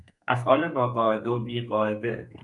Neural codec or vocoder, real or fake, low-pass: codec, 32 kHz, 1.9 kbps, SNAC; fake; 10.8 kHz